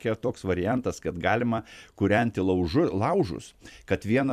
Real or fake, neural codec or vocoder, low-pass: fake; vocoder, 44.1 kHz, 128 mel bands every 256 samples, BigVGAN v2; 14.4 kHz